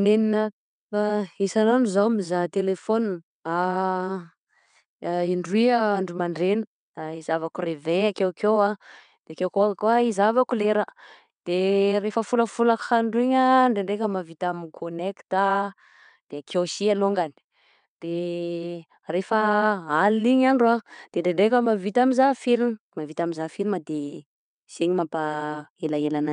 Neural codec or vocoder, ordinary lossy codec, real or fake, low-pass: vocoder, 22.05 kHz, 80 mel bands, WaveNeXt; none; fake; 9.9 kHz